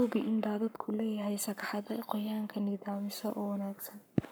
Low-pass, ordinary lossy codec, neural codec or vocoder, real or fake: none; none; codec, 44.1 kHz, 7.8 kbps, Pupu-Codec; fake